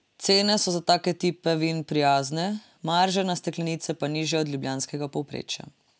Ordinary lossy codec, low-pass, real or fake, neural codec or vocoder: none; none; real; none